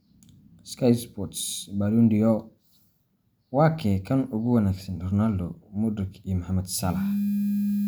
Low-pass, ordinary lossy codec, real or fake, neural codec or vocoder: none; none; real; none